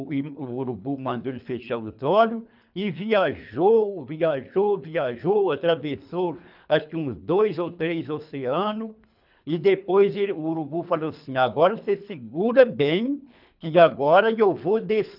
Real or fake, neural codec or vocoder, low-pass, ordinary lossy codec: fake; codec, 24 kHz, 3 kbps, HILCodec; 5.4 kHz; none